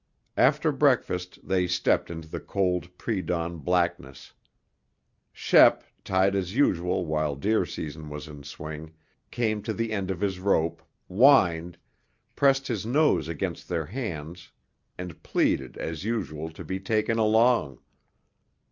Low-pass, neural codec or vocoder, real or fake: 7.2 kHz; none; real